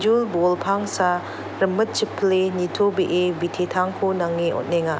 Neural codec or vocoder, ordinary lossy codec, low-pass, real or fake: none; none; none; real